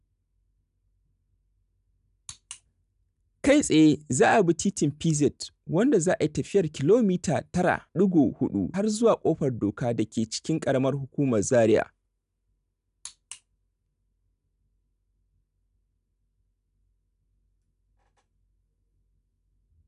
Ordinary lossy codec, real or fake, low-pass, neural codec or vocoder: none; real; 10.8 kHz; none